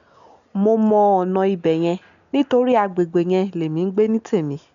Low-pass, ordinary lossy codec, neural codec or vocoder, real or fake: 7.2 kHz; none; none; real